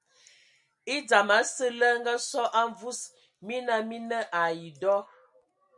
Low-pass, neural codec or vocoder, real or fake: 10.8 kHz; none; real